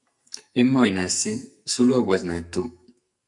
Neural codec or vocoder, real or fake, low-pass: codec, 44.1 kHz, 2.6 kbps, SNAC; fake; 10.8 kHz